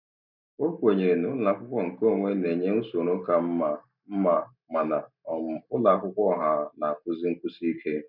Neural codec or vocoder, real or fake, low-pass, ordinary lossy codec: none; real; 5.4 kHz; none